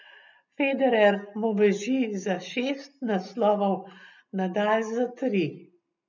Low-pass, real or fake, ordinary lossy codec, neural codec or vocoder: 7.2 kHz; real; none; none